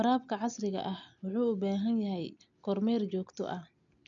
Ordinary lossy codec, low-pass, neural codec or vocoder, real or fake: none; 7.2 kHz; none; real